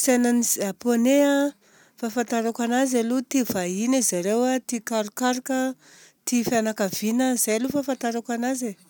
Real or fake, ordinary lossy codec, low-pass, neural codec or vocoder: real; none; none; none